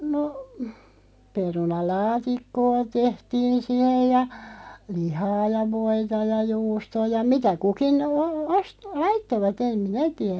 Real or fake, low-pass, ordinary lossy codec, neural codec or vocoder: real; none; none; none